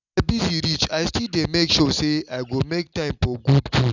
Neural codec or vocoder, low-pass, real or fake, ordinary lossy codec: none; 7.2 kHz; real; none